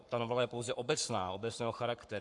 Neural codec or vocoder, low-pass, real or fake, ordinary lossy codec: codec, 44.1 kHz, 7.8 kbps, Pupu-Codec; 10.8 kHz; fake; AAC, 64 kbps